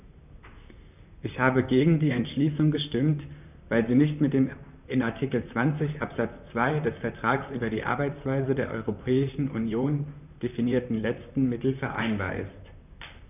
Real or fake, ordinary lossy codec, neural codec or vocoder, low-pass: fake; none; vocoder, 44.1 kHz, 128 mel bands, Pupu-Vocoder; 3.6 kHz